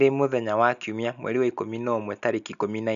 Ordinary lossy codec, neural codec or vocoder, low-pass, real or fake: none; none; 7.2 kHz; real